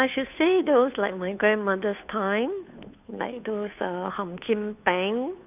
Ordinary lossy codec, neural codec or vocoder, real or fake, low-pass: none; codec, 16 kHz, 2 kbps, FunCodec, trained on Chinese and English, 25 frames a second; fake; 3.6 kHz